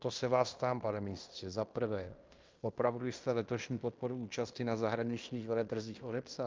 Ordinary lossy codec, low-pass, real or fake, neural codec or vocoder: Opus, 24 kbps; 7.2 kHz; fake; codec, 16 kHz in and 24 kHz out, 0.9 kbps, LongCat-Audio-Codec, fine tuned four codebook decoder